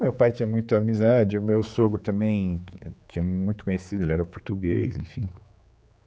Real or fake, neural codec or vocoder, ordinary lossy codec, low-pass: fake; codec, 16 kHz, 4 kbps, X-Codec, HuBERT features, trained on general audio; none; none